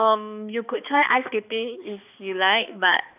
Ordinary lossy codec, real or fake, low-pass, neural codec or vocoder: none; fake; 3.6 kHz; codec, 16 kHz, 2 kbps, X-Codec, HuBERT features, trained on balanced general audio